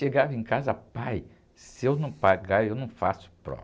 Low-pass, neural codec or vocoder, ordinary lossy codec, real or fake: none; none; none; real